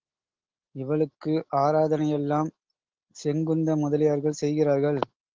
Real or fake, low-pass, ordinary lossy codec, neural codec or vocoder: real; 7.2 kHz; Opus, 16 kbps; none